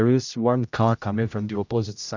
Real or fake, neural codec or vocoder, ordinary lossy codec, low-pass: fake; codec, 16 kHz, 0.5 kbps, X-Codec, HuBERT features, trained on general audio; none; 7.2 kHz